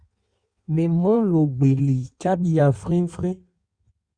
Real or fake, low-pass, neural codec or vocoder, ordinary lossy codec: fake; 9.9 kHz; codec, 16 kHz in and 24 kHz out, 1.1 kbps, FireRedTTS-2 codec; Opus, 64 kbps